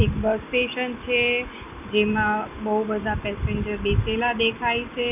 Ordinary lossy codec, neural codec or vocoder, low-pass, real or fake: none; none; 3.6 kHz; real